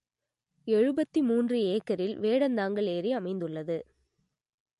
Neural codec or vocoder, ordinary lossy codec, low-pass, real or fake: none; MP3, 48 kbps; 14.4 kHz; real